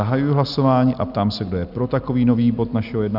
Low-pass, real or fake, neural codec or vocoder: 5.4 kHz; real; none